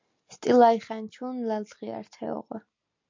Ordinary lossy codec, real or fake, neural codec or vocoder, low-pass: MP3, 48 kbps; real; none; 7.2 kHz